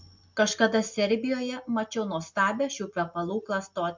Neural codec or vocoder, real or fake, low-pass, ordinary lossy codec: none; real; 7.2 kHz; MP3, 64 kbps